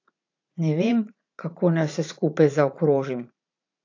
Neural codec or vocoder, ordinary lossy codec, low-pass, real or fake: vocoder, 44.1 kHz, 80 mel bands, Vocos; AAC, 48 kbps; 7.2 kHz; fake